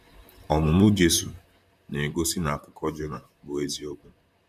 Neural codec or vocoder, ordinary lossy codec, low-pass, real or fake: vocoder, 44.1 kHz, 128 mel bands, Pupu-Vocoder; none; 14.4 kHz; fake